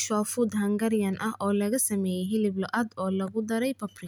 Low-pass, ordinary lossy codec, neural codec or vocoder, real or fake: none; none; none; real